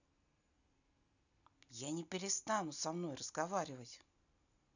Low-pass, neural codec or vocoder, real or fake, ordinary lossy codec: 7.2 kHz; none; real; none